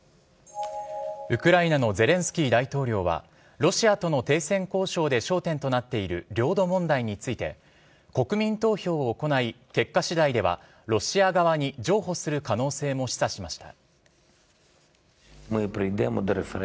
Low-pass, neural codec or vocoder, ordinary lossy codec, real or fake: none; none; none; real